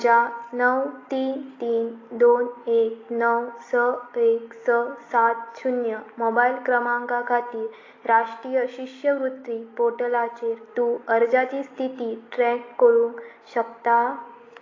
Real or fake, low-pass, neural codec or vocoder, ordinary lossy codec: real; 7.2 kHz; none; AAC, 32 kbps